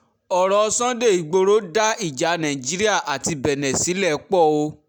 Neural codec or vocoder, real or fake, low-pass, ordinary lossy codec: none; real; none; none